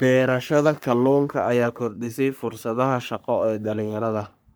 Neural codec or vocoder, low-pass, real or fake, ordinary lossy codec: codec, 44.1 kHz, 3.4 kbps, Pupu-Codec; none; fake; none